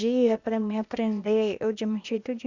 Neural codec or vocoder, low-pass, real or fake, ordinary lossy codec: codec, 16 kHz, 0.8 kbps, ZipCodec; 7.2 kHz; fake; Opus, 64 kbps